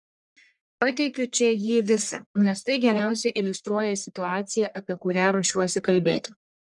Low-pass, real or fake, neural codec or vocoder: 10.8 kHz; fake; codec, 44.1 kHz, 1.7 kbps, Pupu-Codec